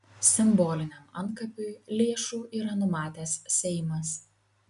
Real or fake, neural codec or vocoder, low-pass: real; none; 10.8 kHz